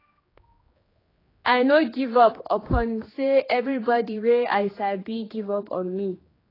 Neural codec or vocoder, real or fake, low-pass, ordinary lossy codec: codec, 16 kHz, 2 kbps, X-Codec, HuBERT features, trained on general audio; fake; 5.4 kHz; AAC, 24 kbps